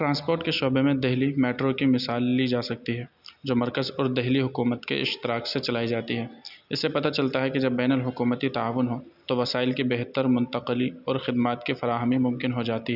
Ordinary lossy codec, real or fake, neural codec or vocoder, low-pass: none; real; none; 5.4 kHz